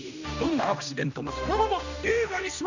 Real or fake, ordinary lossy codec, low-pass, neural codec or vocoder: fake; none; 7.2 kHz; codec, 16 kHz, 1 kbps, X-Codec, HuBERT features, trained on general audio